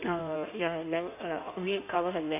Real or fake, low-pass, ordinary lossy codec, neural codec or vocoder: fake; 3.6 kHz; none; codec, 16 kHz in and 24 kHz out, 1.1 kbps, FireRedTTS-2 codec